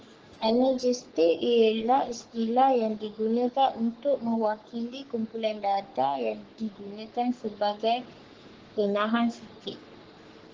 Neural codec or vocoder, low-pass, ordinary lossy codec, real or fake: codec, 44.1 kHz, 3.4 kbps, Pupu-Codec; 7.2 kHz; Opus, 16 kbps; fake